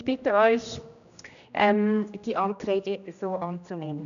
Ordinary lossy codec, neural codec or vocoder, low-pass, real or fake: none; codec, 16 kHz, 1 kbps, X-Codec, HuBERT features, trained on general audio; 7.2 kHz; fake